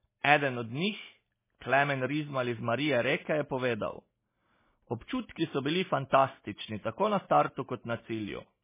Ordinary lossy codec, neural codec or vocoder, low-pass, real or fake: MP3, 16 kbps; none; 3.6 kHz; real